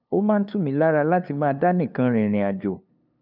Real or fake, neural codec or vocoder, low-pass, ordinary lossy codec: fake; codec, 16 kHz, 2 kbps, FunCodec, trained on LibriTTS, 25 frames a second; 5.4 kHz; none